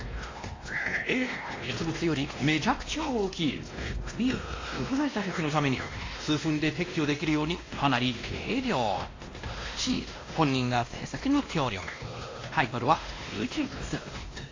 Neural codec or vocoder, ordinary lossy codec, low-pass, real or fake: codec, 16 kHz, 1 kbps, X-Codec, WavLM features, trained on Multilingual LibriSpeech; AAC, 32 kbps; 7.2 kHz; fake